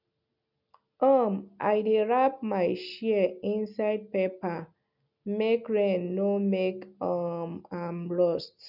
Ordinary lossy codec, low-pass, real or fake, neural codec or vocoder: none; 5.4 kHz; real; none